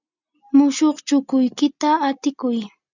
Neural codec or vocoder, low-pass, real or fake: none; 7.2 kHz; real